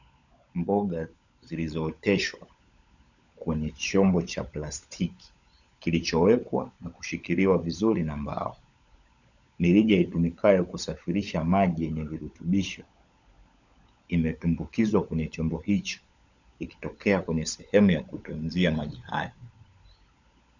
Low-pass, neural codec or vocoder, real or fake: 7.2 kHz; codec, 16 kHz, 16 kbps, FunCodec, trained on LibriTTS, 50 frames a second; fake